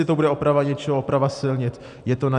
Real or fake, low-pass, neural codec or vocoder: real; 10.8 kHz; none